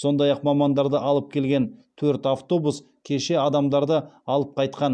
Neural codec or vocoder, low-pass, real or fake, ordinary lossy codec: none; 9.9 kHz; real; none